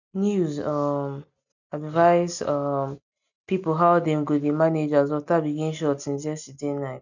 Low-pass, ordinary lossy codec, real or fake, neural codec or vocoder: 7.2 kHz; none; real; none